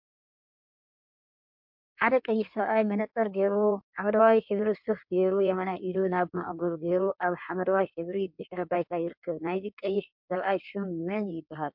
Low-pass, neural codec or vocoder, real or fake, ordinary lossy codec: 5.4 kHz; codec, 16 kHz in and 24 kHz out, 1.1 kbps, FireRedTTS-2 codec; fake; MP3, 48 kbps